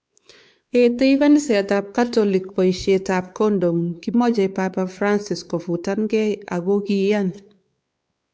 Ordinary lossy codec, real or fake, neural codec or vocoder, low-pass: none; fake; codec, 16 kHz, 4 kbps, X-Codec, WavLM features, trained on Multilingual LibriSpeech; none